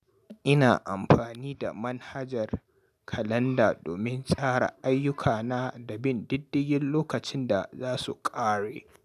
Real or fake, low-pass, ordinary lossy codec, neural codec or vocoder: real; 14.4 kHz; none; none